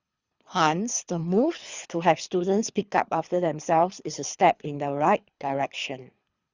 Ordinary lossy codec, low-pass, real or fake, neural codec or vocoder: Opus, 64 kbps; 7.2 kHz; fake; codec, 24 kHz, 3 kbps, HILCodec